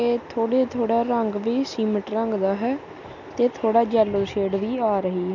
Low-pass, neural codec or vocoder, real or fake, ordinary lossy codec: 7.2 kHz; none; real; none